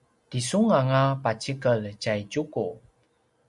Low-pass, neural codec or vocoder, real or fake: 10.8 kHz; none; real